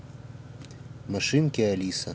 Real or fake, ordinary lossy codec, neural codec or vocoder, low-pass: real; none; none; none